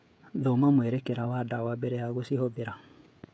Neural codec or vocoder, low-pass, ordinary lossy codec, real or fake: codec, 16 kHz, 16 kbps, FreqCodec, smaller model; none; none; fake